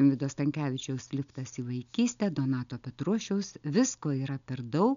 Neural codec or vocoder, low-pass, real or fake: none; 7.2 kHz; real